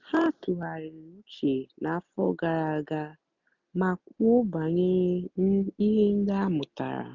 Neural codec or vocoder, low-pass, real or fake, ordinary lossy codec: none; 7.2 kHz; real; none